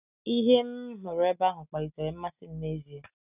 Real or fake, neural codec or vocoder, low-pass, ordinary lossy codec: real; none; 3.6 kHz; none